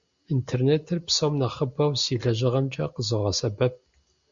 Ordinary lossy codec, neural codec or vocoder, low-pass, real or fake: Opus, 64 kbps; none; 7.2 kHz; real